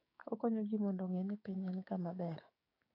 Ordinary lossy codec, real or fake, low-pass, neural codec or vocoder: AAC, 24 kbps; fake; 5.4 kHz; codec, 16 kHz, 6 kbps, DAC